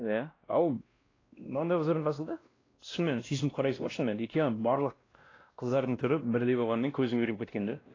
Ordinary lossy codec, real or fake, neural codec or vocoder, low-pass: AAC, 32 kbps; fake; codec, 16 kHz, 1 kbps, X-Codec, WavLM features, trained on Multilingual LibriSpeech; 7.2 kHz